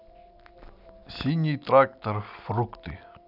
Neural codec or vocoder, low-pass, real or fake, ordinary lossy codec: none; 5.4 kHz; real; none